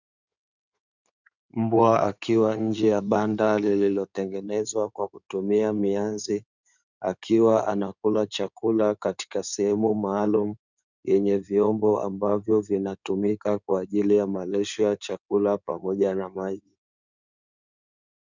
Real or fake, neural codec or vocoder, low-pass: fake; codec, 16 kHz in and 24 kHz out, 2.2 kbps, FireRedTTS-2 codec; 7.2 kHz